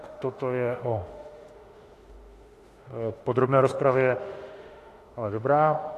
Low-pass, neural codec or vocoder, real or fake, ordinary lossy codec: 14.4 kHz; autoencoder, 48 kHz, 32 numbers a frame, DAC-VAE, trained on Japanese speech; fake; AAC, 48 kbps